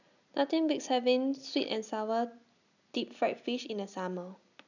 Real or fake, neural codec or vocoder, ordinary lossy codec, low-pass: real; none; none; 7.2 kHz